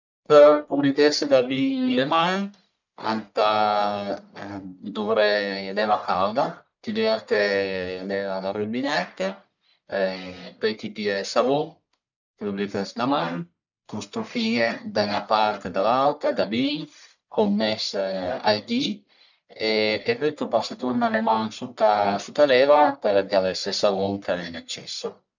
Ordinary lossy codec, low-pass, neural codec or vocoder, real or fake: none; 7.2 kHz; codec, 44.1 kHz, 1.7 kbps, Pupu-Codec; fake